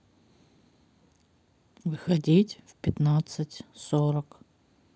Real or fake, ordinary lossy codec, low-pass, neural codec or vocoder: real; none; none; none